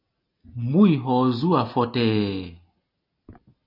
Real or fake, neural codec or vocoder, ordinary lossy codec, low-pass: real; none; AAC, 32 kbps; 5.4 kHz